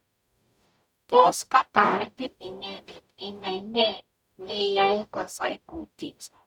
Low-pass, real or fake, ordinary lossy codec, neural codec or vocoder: 19.8 kHz; fake; none; codec, 44.1 kHz, 0.9 kbps, DAC